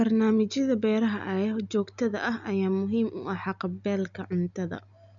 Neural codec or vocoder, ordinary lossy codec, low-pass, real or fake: none; none; 7.2 kHz; real